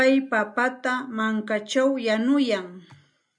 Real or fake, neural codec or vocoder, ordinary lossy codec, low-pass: real; none; MP3, 96 kbps; 9.9 kHz